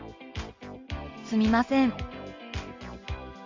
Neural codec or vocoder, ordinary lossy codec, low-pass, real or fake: none; Opus, 32 kbps; 7.2 kHz; real